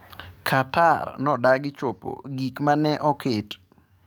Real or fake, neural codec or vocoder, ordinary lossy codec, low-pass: fake; codec, 44.1 kHz, 7.8 kbps, DAC; none; none